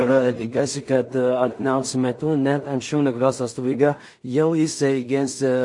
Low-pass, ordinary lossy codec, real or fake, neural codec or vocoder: 10.8 kHz; MP3, 48 kbps; fake; codec, 16 kHz in and 24 kHz out, 0.4 kbps, LongCat-Audio-Codec, two codebook decoder